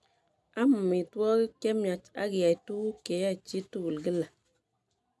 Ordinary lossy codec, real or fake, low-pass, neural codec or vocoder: none; real; none; none